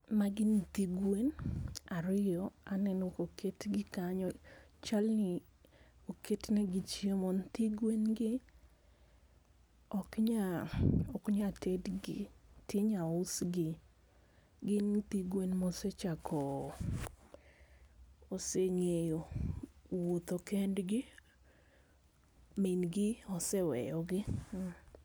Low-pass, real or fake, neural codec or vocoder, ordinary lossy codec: none; real; none; none